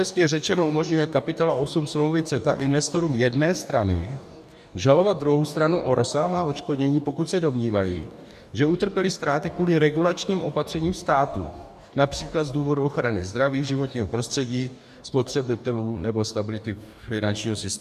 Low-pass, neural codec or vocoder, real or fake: 14.4 kHz; codec, 44.1 kHz, 2.6 kbps, DAC; fake